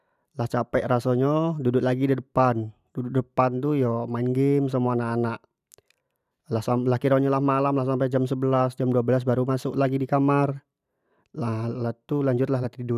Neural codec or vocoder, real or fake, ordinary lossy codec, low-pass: vocoder, 44.1 kHz, 128 mel bands every 512 samples, BigVGAN v2; fake; none; 14.4 kHz